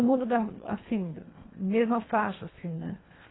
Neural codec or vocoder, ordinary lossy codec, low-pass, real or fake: codec, 24 kHz, 1.5 kbps, HILCodec; AAC, 16 kbps; 7.2 kHz; fake